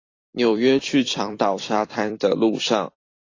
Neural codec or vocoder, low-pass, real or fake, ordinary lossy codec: vocoder, 44.1 kHz, 128 mel bands every 256 samples, BigVGAN v2; 7.2 kHz; fake; AAC, 32 kbps